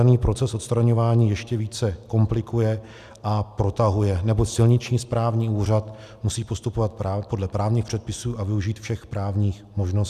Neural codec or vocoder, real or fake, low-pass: none; real; 14.4 kHz